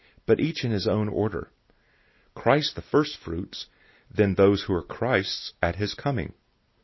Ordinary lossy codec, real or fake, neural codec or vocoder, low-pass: MP3, 24 kbps; real; none; 7.2 kHz